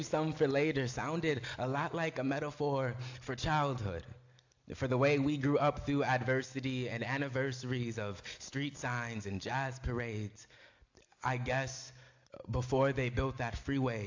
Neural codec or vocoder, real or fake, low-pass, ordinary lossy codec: none; real; 7.2 kHz; AAC, 48 kbps